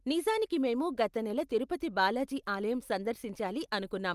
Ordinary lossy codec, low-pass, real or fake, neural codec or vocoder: Opus, 32 kbps; 14.4 kHz; real; none